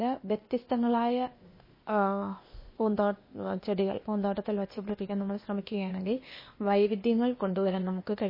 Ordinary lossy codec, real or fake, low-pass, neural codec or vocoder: MP3, 24 kbps; fake; 5.4 kHz; codec, 16 kHz, 0.8 kbps, ZipCodec